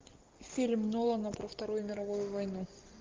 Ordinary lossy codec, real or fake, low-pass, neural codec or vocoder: Opus, 24 kbps; real; 7.2 kHz; none